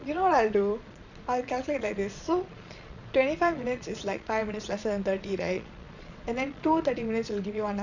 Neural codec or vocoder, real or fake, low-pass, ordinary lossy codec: vocoder, 22.05 kHz, 80 mel bands, Vocos; fake; 7.2 kHz; none